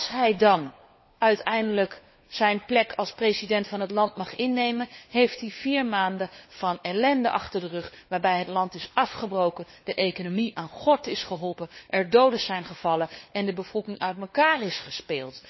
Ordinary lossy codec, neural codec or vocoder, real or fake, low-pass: MP3, 24 kbps; codec, 16 kHz, 4 kbps, FunCodec, trained on LibriTTS, 50 frames a second; fake; 7.2 kHz